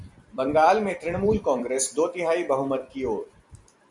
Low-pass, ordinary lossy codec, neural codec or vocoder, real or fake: 10.8 kHz; MP3, 96 kbps; vocoder, 44.1 kHz, 128 mel bands every 256 samples, BigVGAN v2; fake